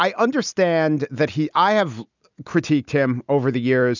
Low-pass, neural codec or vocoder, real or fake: 7.2 kHz; none; real